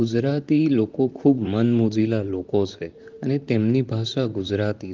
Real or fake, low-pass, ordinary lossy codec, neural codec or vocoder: fake; 7.2 kHz; Opus, 24 kbps; vocoder, 44.1 kHz, 128 mel bands, Pupu-Vocoder